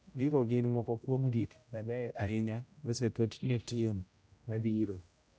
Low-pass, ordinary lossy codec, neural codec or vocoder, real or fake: none; none; codec, 16 kHz, 0.5 kbps, X-Codec, HuBERT features, trained on general audio; fake